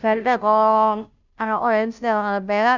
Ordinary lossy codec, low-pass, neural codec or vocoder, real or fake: none; 7.2 kHz; codec, 16 kHz, 0.5 kbps, FunCodec, trained on Chinese and English, 25 frames a second; fake